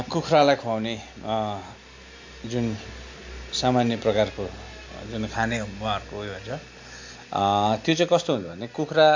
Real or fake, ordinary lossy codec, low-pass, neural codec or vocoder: real; MP3, 48 kbps; 7.2 kHz; none